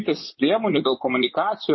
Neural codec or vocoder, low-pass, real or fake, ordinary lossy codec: vocoder, 24 kHz, 100 mel bands, Vocos; 7.2 kHz; fake; MP3, 24 kbps